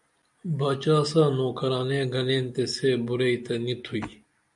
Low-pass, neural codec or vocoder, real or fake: 10.8 kHz; none; real